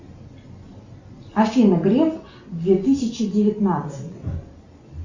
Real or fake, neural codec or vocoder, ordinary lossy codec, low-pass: real; none; Opus, 64 kbps; 7.2 kHz